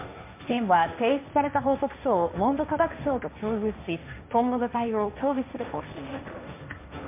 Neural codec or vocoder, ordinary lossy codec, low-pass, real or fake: codec, 16 kHz, 1.1 kbps, Voila-Tokenizer; MP3, 32 kbps; 3.6 kHz; fake